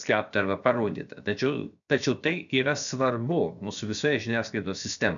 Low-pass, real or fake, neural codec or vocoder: 7.2 kHz; fake; codec, 16 kHz, 0.7 kbps, FocalCodec